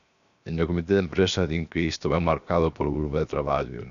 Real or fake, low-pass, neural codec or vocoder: fake; 7.2 kHz; codec, 16 kHz, 0.7 kbps, FocalCodec